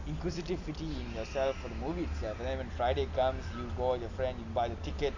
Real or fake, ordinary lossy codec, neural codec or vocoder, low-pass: real; none; none; 7.2 kHz